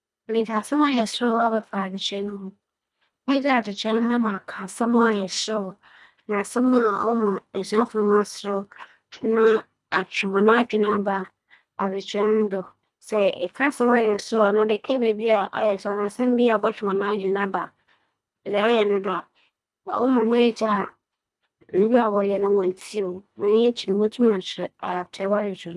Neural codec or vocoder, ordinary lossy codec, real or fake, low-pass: codec, 24 kHz, 1.5 kbps, HILCodec; none; fake; none